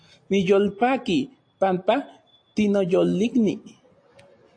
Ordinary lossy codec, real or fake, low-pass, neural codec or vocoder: AAC, 48 kbps; real; 9.9 kHz; none